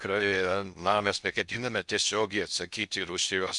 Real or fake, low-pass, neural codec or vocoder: fake; 10.8 kHz; codec, 16 kHz in and 24 kHz out, 0.6 kbps, FocalCodec, streaming, 4096 codes